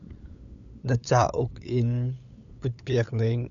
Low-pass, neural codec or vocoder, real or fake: 7.2 kHz; codec, 16 kHz, 8 kbps, FunCodec, trained on LibriTTS, 25 frames a second; fake